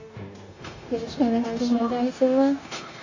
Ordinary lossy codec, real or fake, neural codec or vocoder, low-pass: AAC, 48 kbps; fake; codec, 16 kHz, 0.9 kbps, LongCat-Audio-Codec; 7.2 kHz